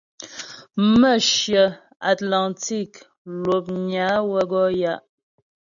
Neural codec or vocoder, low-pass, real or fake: none; 7.2 kHz; real